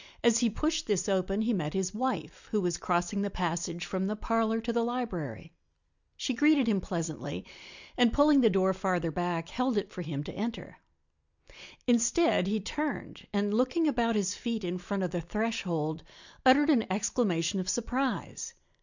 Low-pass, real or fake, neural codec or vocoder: 7.2 kHz; real; none